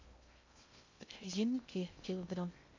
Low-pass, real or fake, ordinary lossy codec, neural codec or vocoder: 7.2 kHz; fake; MP3, 64 kbps; codec, 16 kHz in and 24 kHz out, 0.6 kbps, FocalCodec, streaming, 2048 codes